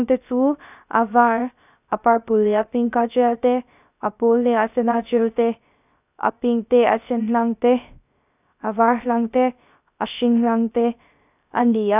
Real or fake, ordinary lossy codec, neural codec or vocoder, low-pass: fake; none; codec, 16 kHz, 0.3 kbps, FocalCodec; 3.6 kHz